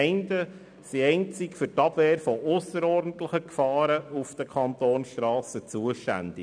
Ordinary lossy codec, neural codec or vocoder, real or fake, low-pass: none; none; real; 9.9 kHz